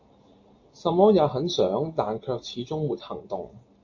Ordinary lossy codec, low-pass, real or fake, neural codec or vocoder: AAC, 48 kbps; 7.2 kHz; real; none